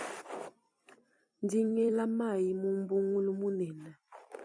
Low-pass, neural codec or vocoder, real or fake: 9.9 kHz; vocoder, 44.1 kHz, 128 mel bands every 256 samples, BigVGAN v2; fake